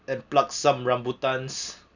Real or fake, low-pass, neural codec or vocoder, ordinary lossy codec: real; 7.2 kHz; none; none